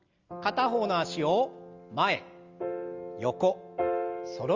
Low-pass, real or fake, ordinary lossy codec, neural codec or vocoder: 7.2 kHz; real; Opus, 32 kbps; none